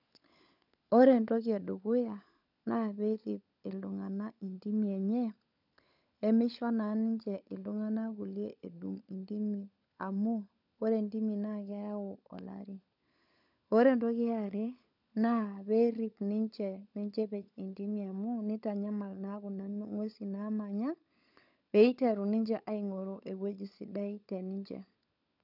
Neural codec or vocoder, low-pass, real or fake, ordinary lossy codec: codec, 16 kHz, 16 kbps, FunCodec, trained on LibriTTS, 50 frames a second; 5.4 kHz; fake; none